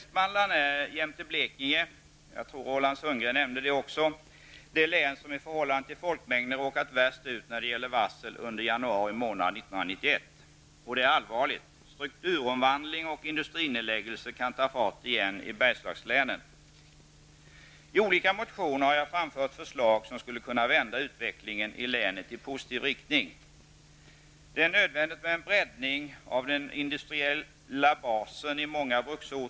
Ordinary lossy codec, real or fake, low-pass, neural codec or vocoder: none; real; none; none